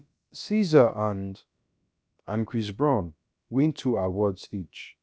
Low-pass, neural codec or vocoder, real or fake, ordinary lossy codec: none; codec, 16 kHz, about 1 kbps, DyCAST, with the encoder's durations; fake; none